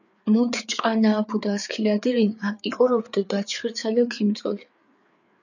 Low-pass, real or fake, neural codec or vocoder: 7.2 kHz; fake; codec, 16 kHz, 4 kbps, FreqCodec, larger model